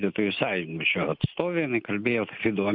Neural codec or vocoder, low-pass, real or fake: none; 5.4 kHz; real